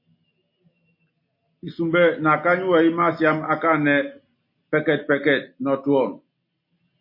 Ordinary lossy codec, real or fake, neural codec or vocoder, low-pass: MP3, 32 kbps; real; none; 5.4 kHz